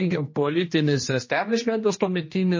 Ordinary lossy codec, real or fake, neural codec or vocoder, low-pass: MP3, 32 kbps; fake; codec, 16 kHz, 1 kbps, X-Codec, HuBERT features, trained on general audio; 7.2 kHz